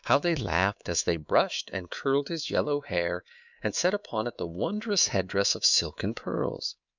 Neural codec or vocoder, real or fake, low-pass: codec, 24 kHz, 3.1 kbps, DualCodec; fake; 7.2 kHz